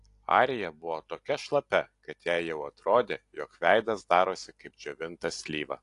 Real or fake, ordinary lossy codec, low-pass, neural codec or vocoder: real; AAC, 48 kbps; 10.8 kHz; none